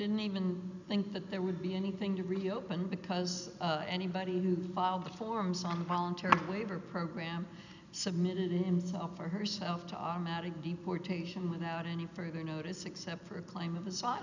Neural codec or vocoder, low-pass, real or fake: none; 7.2 kHz; real